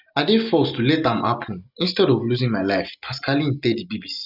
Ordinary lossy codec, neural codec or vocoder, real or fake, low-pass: none; none; real; 5.4 kHz